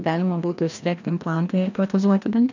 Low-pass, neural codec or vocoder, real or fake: 7.2 kHz; codec, 16 kHz, 1 kbps, FreqCodec, larger model; fake